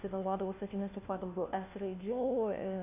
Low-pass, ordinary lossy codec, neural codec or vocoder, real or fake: 3.6 kHz; AAC, 32 kbps; codec, 16 kHz, 1 kbps, FunCodec, trained on LibriTTS, 50 frames a second; fake